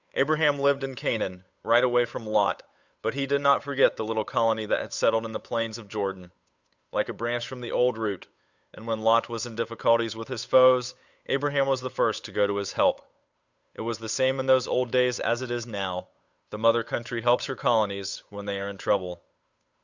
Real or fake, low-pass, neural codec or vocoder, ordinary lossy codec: fake; 7.2 kHz; codec, 16 kHz, 8 kbps, FunCodec, trained on Chinese and English, 25 frames a second; Opus, 64 kbps